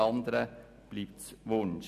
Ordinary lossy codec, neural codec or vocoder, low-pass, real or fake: none; none; 14.4 kHz; real